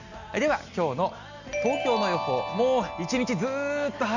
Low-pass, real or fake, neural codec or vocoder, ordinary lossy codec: 7.2 kHz; real; none; none